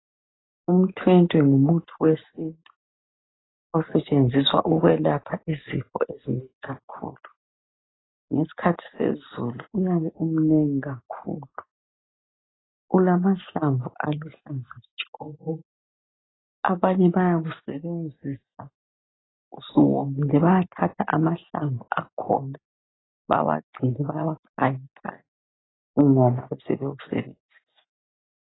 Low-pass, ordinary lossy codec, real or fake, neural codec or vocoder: 7.2 kHz; AAC, 16 kbps; real; none